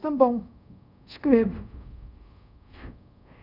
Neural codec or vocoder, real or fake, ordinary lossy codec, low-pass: codec, 24 kHz, 0.5 kbps, DualCodec; fake; none; 5.4 kHz